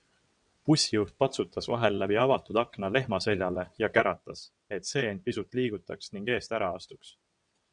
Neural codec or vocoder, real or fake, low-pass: vocoder, 22.05 kHz, 80 mel bands, WaveNeXt; fake; 9.9 kHz